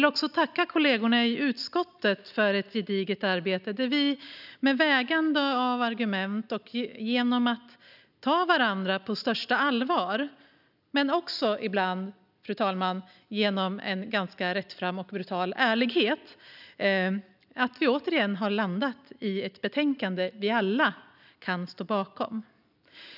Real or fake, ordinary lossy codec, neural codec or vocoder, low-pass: real; none; none; 5.4 kHz